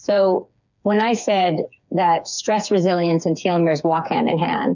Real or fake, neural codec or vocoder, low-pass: fake; codec, 16 kHz, 4 kbps, FreqCodec, smaller model; 7.2 kHz